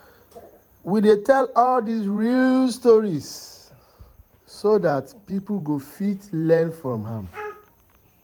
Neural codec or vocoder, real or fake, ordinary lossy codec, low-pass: vocoder, 44.1 kHz, 128 mel bands every 512 samples, BigVGAN v2; fake; none; 19.8 kHz